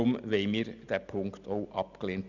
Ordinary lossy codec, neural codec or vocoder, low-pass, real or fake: none; none; 7.2 kHz; real